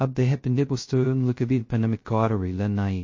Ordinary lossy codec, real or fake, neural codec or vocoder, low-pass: MP3, 32 kbps; fake; codec, 16 kHz, 0.2 kbps, FocalCodec; 7.2 kHz